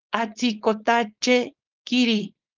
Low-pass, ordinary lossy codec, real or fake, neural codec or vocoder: 7.2 kHz; Opus, 24 kbps; fake; codec, 16 kHz, 4.8 kbps, FACodec